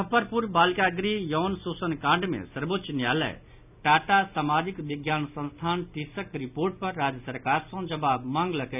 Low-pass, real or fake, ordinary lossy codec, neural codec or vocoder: 3.6 kHz; real; none; none